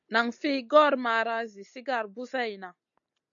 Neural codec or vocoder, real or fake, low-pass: none; real; 7.2 kHz